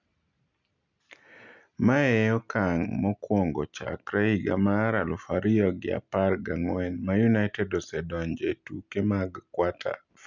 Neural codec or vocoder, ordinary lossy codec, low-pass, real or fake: none; none; 7.2 kHz; real